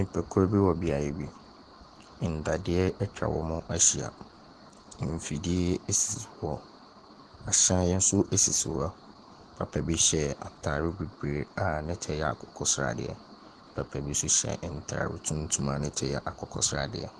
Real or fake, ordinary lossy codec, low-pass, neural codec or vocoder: real; Opus, 16 kbps; 10.8 kHz; none